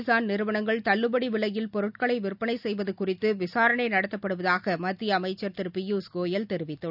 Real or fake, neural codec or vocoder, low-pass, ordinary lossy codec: real; none; 5.4 kHz; none